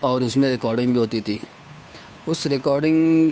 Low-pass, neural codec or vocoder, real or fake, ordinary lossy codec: none; codec, 16 kHz, 2 kbps, FunCodec, trained on Chinese and English, 25 frames a second; fake; none